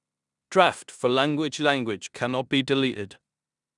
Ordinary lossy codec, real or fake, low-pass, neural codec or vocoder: none; fake; 10.8 kHz; codec, 16 kHz in and 24 kHz out, 0.9 kbps, LongCat-Audio-Codec, four codebook decoder